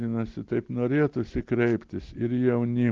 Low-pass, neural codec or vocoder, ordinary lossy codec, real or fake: 7.2 kHz; none; Opus, 16 kbps; real